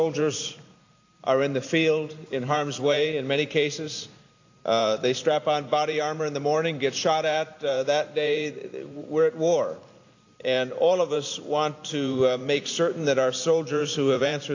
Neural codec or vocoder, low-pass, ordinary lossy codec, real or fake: vocoder, 44.1 kHz, 128 mel bands every 512 samples, BigVGAN v2; 7.2 kHz; AAC, 48 kbps; fake